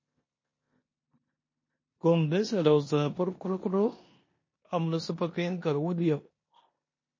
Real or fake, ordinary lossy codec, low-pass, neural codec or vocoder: fake; MP3, 32 kbps; 7.2 kHz; codec, 16 kHz in and 24 kHz out, 0.9 kbps, LongCat-Audio-Codec, four codebook decoder